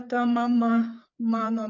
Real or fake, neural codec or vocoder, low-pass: fake; codec, 16 kHz, 4 kbps, FreqCodec, larger model; 7.2 kHz